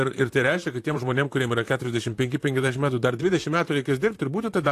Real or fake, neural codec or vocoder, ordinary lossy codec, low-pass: fake; vocoder, 44.1 kHz, 128 mel bands, Pupu-Vocoder; AAC, 64 kbps; 14.4 kHz